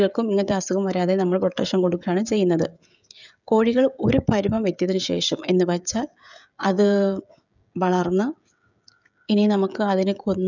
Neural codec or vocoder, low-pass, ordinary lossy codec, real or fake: codec, 16 kHz, 16 kbps, FreqCodec, smaller model; 7.2 kHz; none; fake